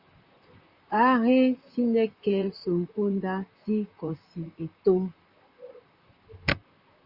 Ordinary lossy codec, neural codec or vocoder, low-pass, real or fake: Opus, 64 kbps; vocoder, 44.1 kHz, 128 mel bands, Pupu-Vocoder; 5.4 kHz; fake